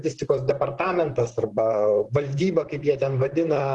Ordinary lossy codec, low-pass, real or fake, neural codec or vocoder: Opus, 16 kbps; 10.8 kHz; fake; vocoder, 44.1 kHz, 128 mel bands, Pupu-Vocoder